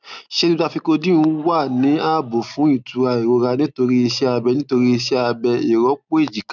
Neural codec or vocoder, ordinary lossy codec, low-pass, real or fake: none; none; 7.2 kHz; real